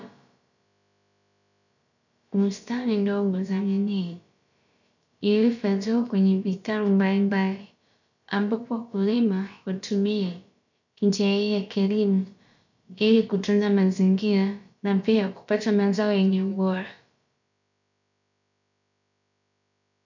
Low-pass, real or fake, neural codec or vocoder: 7.2 kHz; fake; codec, 16 kHz, about 1 kbps, DyCAST, with the encoder's durations